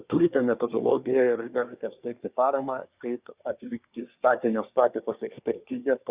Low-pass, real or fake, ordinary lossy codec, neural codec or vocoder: 3.6 kHz; fake; Opus, 64 kbps; codec, 24 kHz, 1 kbps, SNAC